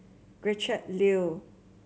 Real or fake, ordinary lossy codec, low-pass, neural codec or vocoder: real; none; none; none